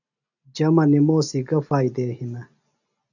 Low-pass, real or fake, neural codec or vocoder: 7.2 kHz; real; none